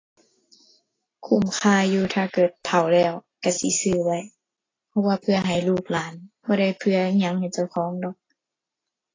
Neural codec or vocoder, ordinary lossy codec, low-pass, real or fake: none; AAC, 32 kbps; 7.2 kHz; real